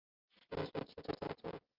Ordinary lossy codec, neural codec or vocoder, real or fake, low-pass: Opus, 24 kbps; none; real; 5.4 kHz